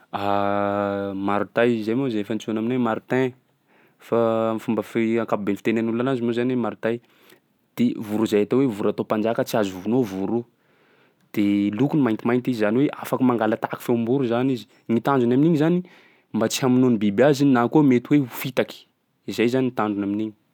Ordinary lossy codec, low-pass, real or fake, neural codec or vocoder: none; 19.8 kHz; real; none